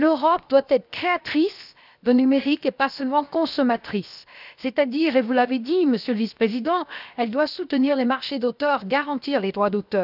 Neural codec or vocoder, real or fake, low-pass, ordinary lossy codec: codec, 16 kHz, 0.7 kbps, FocalCodec; fake; 5.4 kHz; none